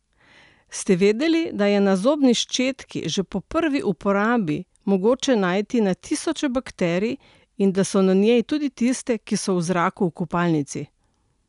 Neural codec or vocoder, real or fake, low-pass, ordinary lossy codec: none; real; 10.8 kHz; none